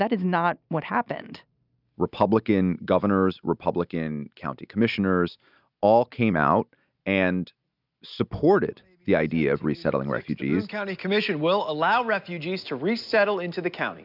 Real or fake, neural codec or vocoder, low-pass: real; none; 5.4 kHz